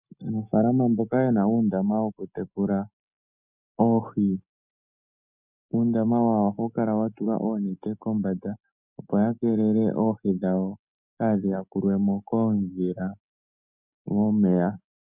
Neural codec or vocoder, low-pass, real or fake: none; 3.6 kHz; real